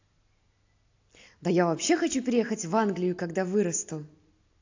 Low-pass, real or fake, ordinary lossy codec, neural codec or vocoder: 7.2 kHz; real; AAC, 48 kbps; none